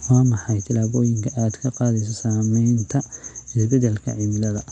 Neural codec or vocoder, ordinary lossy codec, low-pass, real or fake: none; none; 9.9 kHz; real